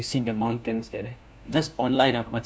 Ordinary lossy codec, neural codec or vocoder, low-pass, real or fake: none; codec, 16 kHz, 1 kbps, FunCodec, trained on LibriTTS, 50 frames a second; none; fake